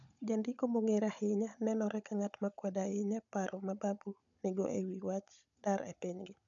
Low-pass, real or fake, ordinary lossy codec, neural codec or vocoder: 7.2 kHz; fake; none; codec, 16 kHz, 16 kbps, FunCodec, trained on Chinese and English, 50 frames a second